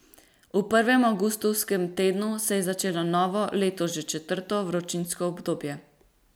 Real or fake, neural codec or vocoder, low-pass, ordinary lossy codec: real; none; none; none